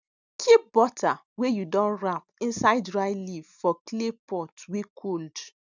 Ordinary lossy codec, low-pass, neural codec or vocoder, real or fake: none; 7.2 kHz; none; real